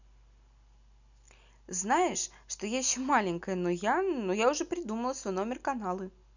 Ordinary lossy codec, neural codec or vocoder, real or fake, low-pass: none; none; real; 7.2 kHz